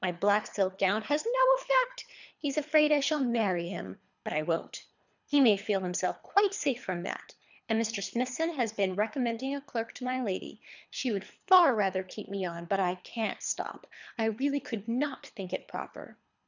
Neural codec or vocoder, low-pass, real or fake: codec, 24 kHz, 3 kbps, HILCodec; 7.2 kHz; fake